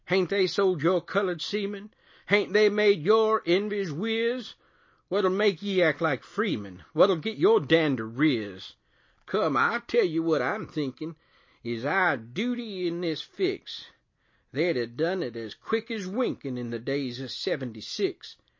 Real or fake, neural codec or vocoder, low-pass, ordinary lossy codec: real; none; 7.2 kHz; MP3, 32 kbps